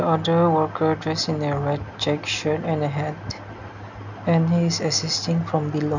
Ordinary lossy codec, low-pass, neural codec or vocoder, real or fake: none; 7.2 kHz; none; real